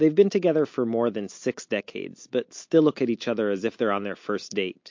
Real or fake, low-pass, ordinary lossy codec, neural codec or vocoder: real; 7.2 kHz; MP3, 48 kbps; none